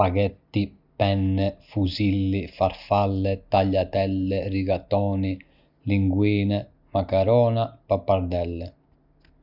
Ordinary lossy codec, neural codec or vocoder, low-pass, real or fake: none; none; 5.4 kHz; real